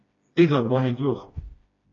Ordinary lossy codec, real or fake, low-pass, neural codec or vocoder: AAC, 32 kbps; fake; 7.2 kHz; codec, 16 kHz, 1 kbps, FreqCodec, smaller model